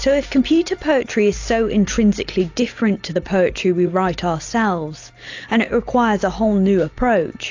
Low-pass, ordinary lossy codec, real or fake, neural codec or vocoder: 7.2 kHz; AAC, 48 kbps; real; none